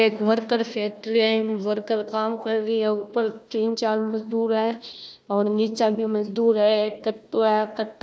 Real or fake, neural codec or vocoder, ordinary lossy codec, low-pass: fake; codec, 16 kHz, 1 kbps, FunCodec, trained on Chinese and English, 50 frames a second; none; none